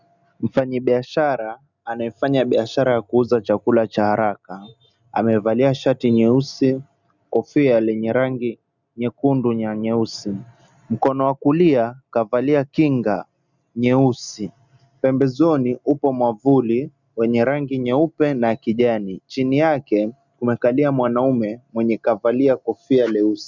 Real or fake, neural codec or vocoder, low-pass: real; none; 7.2 kHz